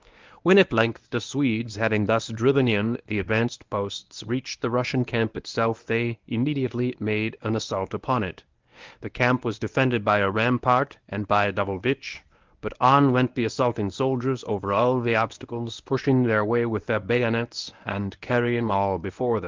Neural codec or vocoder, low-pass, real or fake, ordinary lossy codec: codec, 24 kHz, 0.9 kbps, WavTokenizer, medium speech release version 1; 7.2 kHz; fake; Opus, 24 kbps